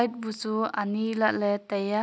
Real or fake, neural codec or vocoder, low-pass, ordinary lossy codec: real; none; none; none